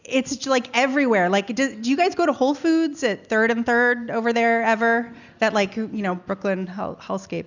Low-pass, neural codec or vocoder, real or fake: 7.2 kHz; none; real